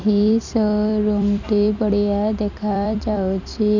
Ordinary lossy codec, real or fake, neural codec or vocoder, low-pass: none; fake; vocoder, 44.1 kHz, 128 mel bands every 256 samples, BigVGAN v2; 7.2 kHz